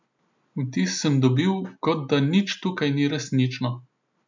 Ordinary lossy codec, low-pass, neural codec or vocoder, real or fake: MP3, 64 kbps; 7.2 kHz; none; real